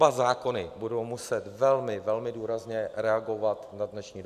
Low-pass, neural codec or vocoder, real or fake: 14.4 kHz; none; real